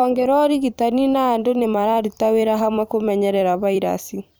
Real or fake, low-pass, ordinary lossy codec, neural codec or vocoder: fake; none; none; vocoder, 44.1 kHz, 128 mel bands every 256 samples, BigVGAN v2